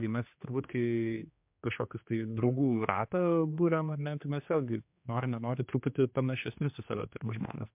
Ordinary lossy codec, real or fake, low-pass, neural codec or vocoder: MP3, 32 kbps; fake; 3.6 kHz; codec, 16 kHz, 2 kbps, X-Codec, HuBERT features, trained on general audio